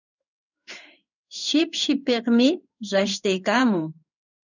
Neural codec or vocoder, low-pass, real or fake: codec, 16 kHz in and 24 kHz out, 1 kbps, XY-Tokenizer; 7.2 kHz; fake